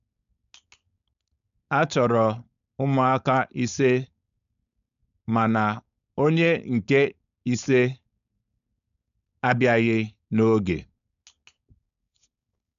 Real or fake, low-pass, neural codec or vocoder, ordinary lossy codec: fake; 7.2 kHz; codec, 16 kHz, 4.8 kbps, FACodec; MP3, 96 kbps